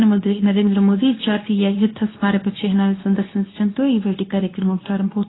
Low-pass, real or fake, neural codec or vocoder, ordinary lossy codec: 7.2 kHz; fake; codec, 24 kHz, 0.9 kbps, WavTokenizer, medium speech release version 2; AAC, 16 kbps